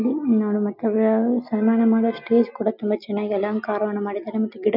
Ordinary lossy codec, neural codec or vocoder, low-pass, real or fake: none; none; 5.4 kHz; real